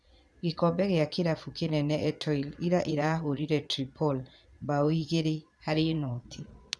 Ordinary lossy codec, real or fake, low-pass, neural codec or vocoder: none; fake; none; vocoder, 22.05 kHz, 80 mel bands, Vocos